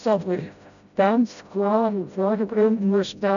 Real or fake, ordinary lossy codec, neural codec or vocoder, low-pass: fake; none; codec, 16 kHz, 0.5 kbps, FreqCodec, smaller model; 7.2 kHz